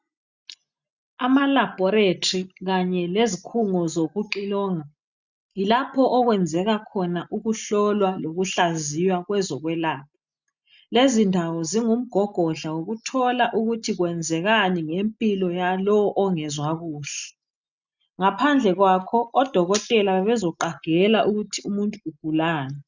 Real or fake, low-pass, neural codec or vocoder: real; 7.2 kHz; none